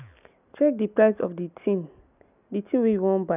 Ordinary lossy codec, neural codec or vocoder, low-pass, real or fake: none; none; 3.6 kHz; real